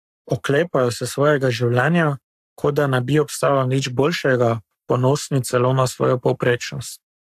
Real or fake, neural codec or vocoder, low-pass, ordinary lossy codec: fake; codec, 44.1 kHz, 7.8 kbps, Pupu-Codec; 14.4 kHz; AAC, 96 kbps